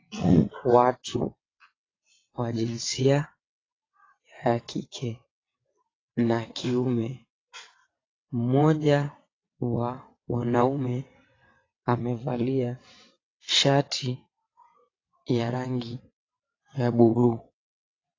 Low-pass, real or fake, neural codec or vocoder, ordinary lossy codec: 7.2 kHz; fake; vocoder, 22.05 kHz, 80 mel bands, WaveNeXt; AAC, 32 kbps